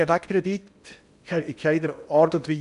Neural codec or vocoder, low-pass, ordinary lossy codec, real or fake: codec, 16 kHz in and 24 kHz out, 0.8 kbps, FocalCodec, streaming, 65536 codes; 10.8 kHz; none; fake